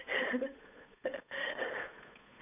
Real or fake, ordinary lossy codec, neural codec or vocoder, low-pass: fake; none; codec, 16 kHz, 8 kbps, FunCodec, trained on Chinese and English, 25 frames a second; 3.6 kHz